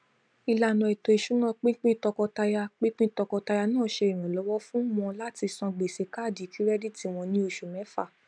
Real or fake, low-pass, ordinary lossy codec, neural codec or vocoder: real; 9.9 kHz; none; none